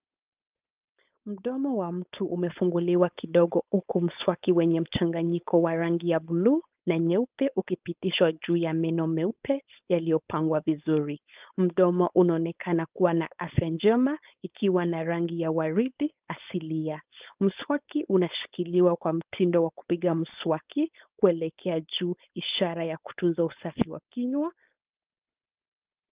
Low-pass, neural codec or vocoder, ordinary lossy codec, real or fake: 3.6 kHz; codec, 16 kHz, 4.8 kbps, FACodec; Opus, 24 kbps; fake